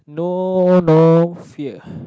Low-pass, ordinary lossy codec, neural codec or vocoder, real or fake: none; none; none; real